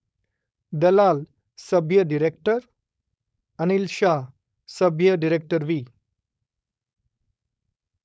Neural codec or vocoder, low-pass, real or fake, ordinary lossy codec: codec, 16 kHz, 4.8 kbps, FACodec; none; fake; none